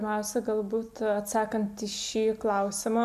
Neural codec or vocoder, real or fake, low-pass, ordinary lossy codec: none; real; 14.4 kHz; MP3, 96 kbps